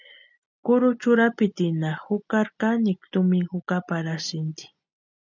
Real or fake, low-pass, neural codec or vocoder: real; 7.2 kHz; none